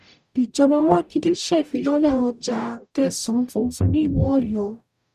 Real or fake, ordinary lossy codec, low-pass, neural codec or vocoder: fake; none; 14.4 kHz; codec, 44.1 kHz, 0.9 kbps, DAC